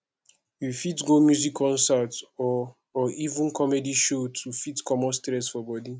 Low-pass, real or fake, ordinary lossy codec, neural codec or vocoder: none; real; none; none